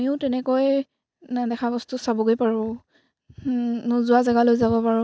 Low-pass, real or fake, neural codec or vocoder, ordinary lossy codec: none; real; none; none